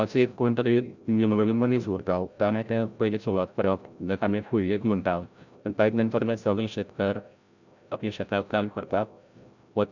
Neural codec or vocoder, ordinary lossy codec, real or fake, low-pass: codec, 16 kHz, 0.5 kbps, FreqCodec, larger model; none; fake; 7.2 kHz